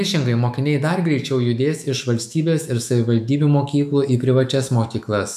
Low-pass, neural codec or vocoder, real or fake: 14.4 kHz; autoencoder, 48 kHz, 128 numbers a frame, DAC-VAE, trained on Japanese speech; fake